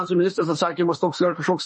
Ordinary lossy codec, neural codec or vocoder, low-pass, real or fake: MP3, 32 kbps; autoencoder, 48 kHz, 32 numbers a frame, DAC-VAE, trained on Japanese speech; 10.8 kHz; fake